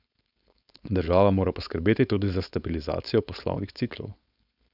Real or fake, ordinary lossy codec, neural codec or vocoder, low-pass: fake; none; codec, 16 kHz, 4.8 kbps, FACodec; 5.4 kHz